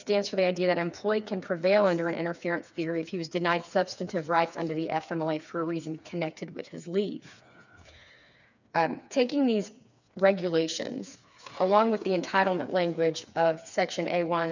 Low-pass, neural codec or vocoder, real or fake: 7.2 kHz; codec, 16 kHz, 4 kbps, FreqCodec, smaller model; fake